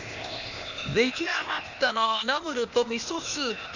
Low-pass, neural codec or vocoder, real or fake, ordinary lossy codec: 7.2 kHz; codec, 16 kHz, 0.8 kbps, ZipCodec; fake; none